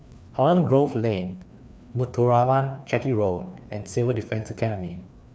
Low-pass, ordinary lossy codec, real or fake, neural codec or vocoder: none; none; fake; codec, 16 kHz, 2 kbps, FreqCodec, larger model